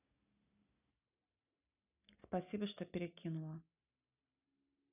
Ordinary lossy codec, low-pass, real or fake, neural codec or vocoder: none; 3.6 kHz; real; none